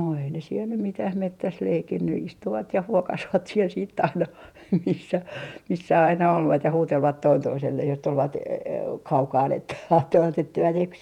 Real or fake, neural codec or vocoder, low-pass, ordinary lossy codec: fake; vocoder, 48 kHz, 128 mel bands, Vocos; 19.8 kHz; none